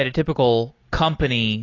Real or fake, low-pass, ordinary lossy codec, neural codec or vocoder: real; 7.2 kHz; AAC, 32 kbps; none